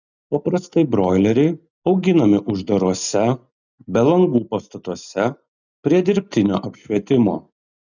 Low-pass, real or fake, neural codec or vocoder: 7.2 kHz; real; none